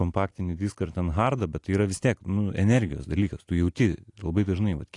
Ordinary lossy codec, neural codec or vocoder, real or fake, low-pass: AAC, 48 kbps; none; real; 10.8 kHz